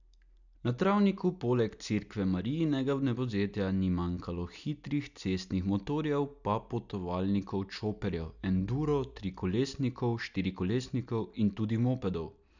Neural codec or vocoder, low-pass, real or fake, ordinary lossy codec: none; 7.2 kHz; real; none